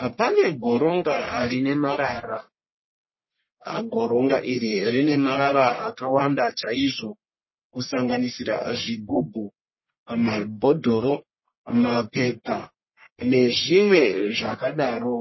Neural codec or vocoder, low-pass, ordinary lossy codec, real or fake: codec, 44.1 kHz, 1.7 kbps, Pupu-Codec; 7.2 kHz; MP3, 24 kbps; fake